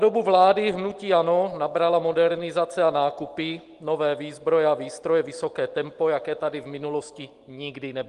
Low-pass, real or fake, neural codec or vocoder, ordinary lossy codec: 10.8 kHz; real; none; Opus, 24 kbps